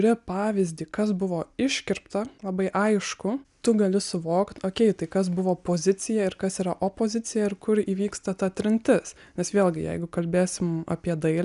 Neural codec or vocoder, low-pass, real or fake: none; 10.8 kHz; real